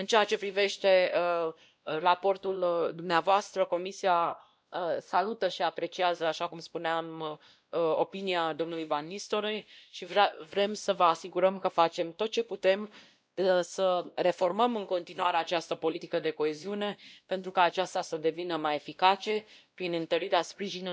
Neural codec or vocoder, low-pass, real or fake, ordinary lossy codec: codec, 16 kHz, 1 kbps, X-Codec, WavLM features, trained on Multilingual LibriSpeech; none; fake; none